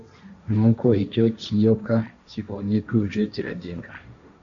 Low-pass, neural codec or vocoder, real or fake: 7.2 kHz; codec, 16 kHz, 1.1 kbps, Voila-Tokenizer; fake